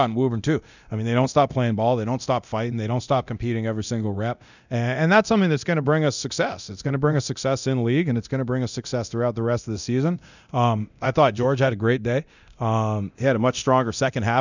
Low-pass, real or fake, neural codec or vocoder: 7.2 kHz; fake; codec, 24 kHz, 0.9 kbps, DualCodec